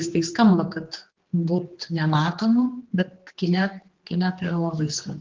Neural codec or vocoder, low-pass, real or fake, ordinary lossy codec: codec, 16 kHz, 2 kbps, X-Codec, HuBERT features, trained on general audio; 7.2 kHz; fake; Opus, 16 kbps